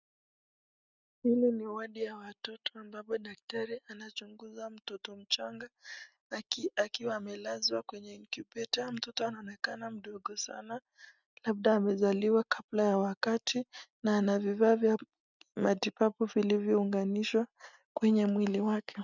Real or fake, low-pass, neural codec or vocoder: real; 7.2 kHz; none